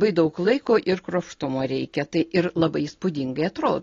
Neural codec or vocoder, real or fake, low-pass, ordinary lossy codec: none; real; 7.2 kHz; AAC, 32 kbps